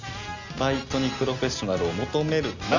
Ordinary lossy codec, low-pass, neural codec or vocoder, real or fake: none; 7.2 kHz; none; real